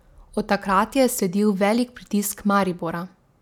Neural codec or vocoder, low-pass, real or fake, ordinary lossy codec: none; 19.8 kHz; real; none